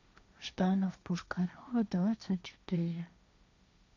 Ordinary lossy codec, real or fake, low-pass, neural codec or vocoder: none; fake; 7.2 kHz; codec, 16 kHz, 1.1 kbps, Voila-Tokenizer